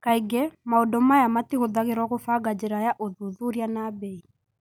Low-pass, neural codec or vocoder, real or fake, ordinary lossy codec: none; none; real; none